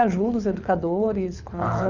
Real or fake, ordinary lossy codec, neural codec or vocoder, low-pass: fake; none; codec, 24 kHz, 6 kbps, HILCodec; 7.2 kHz